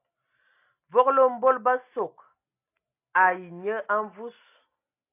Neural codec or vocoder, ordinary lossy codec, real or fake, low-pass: none; AAC, 24 kbps; real; 3.6 kHz